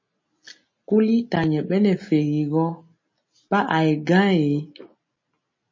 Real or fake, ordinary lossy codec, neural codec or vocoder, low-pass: real; MP3, 32 kbps; none; 7.2 kHz